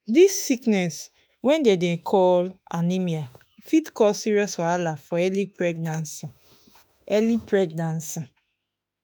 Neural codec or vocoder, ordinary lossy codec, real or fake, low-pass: autoencoder, 48 kHz, 32 numbers a frame, DAC-VAE, trained on Japanese speech; none; fake; none